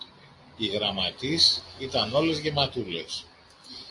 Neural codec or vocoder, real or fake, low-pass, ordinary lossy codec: none; real; 10.8 kHz; AAC, 48 kbps